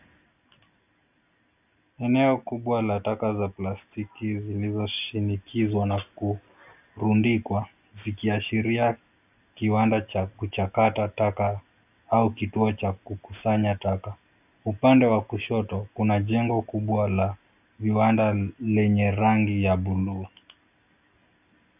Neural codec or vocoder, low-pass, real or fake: none; 3.6 kHz; real